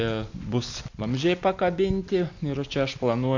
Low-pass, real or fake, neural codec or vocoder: 7.2 kHz; real; none